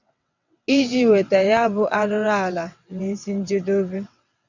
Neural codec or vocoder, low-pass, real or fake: vocoder, 22.05 kHz, 80 mel bands, WaveNeXt; 7.2 kHz; fake